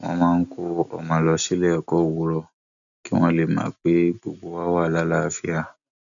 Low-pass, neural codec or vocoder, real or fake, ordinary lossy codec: 7.2 kHz; none; real; none